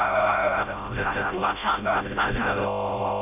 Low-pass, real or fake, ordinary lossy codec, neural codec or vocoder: 3.6 kHz; fake; none; codec, 16 kHz, 0.5 kbps, FreqCodec, smaller model